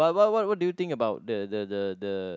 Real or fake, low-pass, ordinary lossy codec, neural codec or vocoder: real; none; none; none